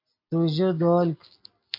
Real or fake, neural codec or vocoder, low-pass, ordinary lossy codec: real; none; 5.4 kHz; MP3, 32 kbps